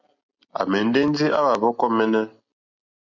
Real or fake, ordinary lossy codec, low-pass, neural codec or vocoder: real; MP3, 64 kbps; 7.2 kHz; none